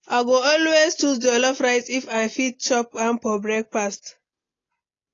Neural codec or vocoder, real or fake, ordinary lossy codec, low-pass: none; real; AAC, 32 kbps; 7.2 kHz